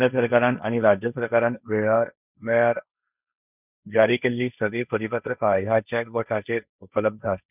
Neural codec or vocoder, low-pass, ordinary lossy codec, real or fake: codec, 16 kHz, 1.1 kbps, Voila-Tokenizer; 3.6 kHz; none; fake